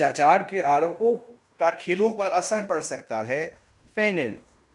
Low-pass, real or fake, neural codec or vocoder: 10.8 kHz; fake; codec, 16 kHz in and 24 kHz out, 0.9 kbps, LongCat-Audio-Codec, fine tuned four codebook decoder